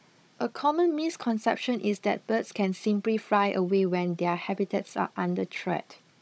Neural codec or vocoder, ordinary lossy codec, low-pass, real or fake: codec, 16 kHz, 16 kbps, FunCodec, trained on Chinese and English, 50 frames a second; none; none; fake